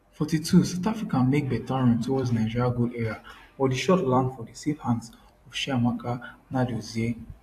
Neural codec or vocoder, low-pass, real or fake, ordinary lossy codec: vocoder, 44.1 kHz, 128 mel bands every 256 samples, BigVGAN v2; 14.4 kHz; fake; AAC, 64 kbps